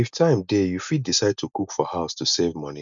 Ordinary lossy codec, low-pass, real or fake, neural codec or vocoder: none; 7.2 kHz; real; none